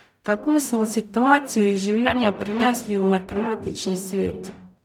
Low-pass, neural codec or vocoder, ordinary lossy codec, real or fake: 19.8 kHz; codec, 44.1 kHz, 0.9 kbps, DAC; none; fake